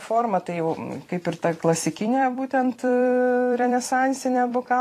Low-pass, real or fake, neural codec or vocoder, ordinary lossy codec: 14.4 kHz; fake; vocoder, 44.1 kHz, 128 mel bands every 256 samples, BigVGAN v2; AAC, 48 kbps